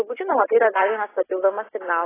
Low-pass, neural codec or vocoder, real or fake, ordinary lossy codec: 3.6 kHz; none; real; AAC, 16 kbps